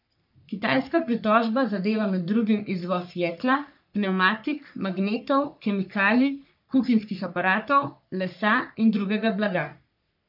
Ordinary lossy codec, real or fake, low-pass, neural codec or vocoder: none; fake; 5.4 kHz; codec, 44.1 kHz, 3.4 kbps, Pupu-Codec